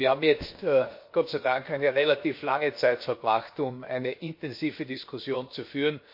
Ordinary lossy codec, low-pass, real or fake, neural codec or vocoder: MP3, 32 kbps; 5.4 kHz; fake; codec, 16 kHz, 0.7 kbps, FocalCodec